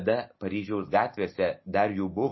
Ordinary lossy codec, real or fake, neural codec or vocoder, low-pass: MP3, 24 kbps; real; none; 7.2 kHz